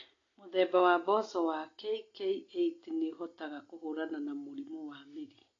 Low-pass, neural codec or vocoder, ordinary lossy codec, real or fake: 7.2 kHz; none; AAC, 32 kbps; real